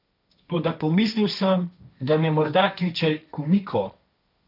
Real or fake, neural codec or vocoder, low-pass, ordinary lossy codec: fake; codec, 16 kHz, 1.1 kbps, Voila-Tokenizer; 5.4 kHz; none